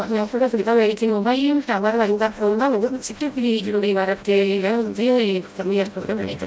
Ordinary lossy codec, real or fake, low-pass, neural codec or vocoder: none; fake; none; codec, 16 kHz, 0.5 kbps, FreqCodec, smaller model